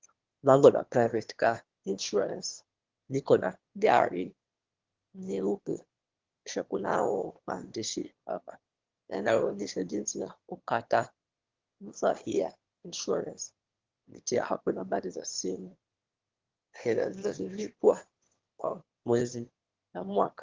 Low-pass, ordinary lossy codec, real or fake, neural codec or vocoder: 7.2 kHz; Opus, 16 kbps; fake; autoencoder, 22.05 kHz, a latent of 192 numbers a frame, VITS, trained on one speaker